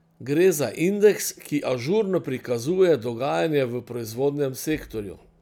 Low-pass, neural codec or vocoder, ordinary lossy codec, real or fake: 19.8 kHz; none; none; real